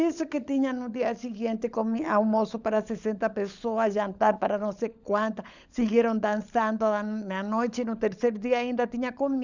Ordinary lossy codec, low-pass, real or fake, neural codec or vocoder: none; 7.2 kHz; fake; codec, 16 kHz, 16 kbps, FunCodec, trained on LibriTTS, 50 frames a second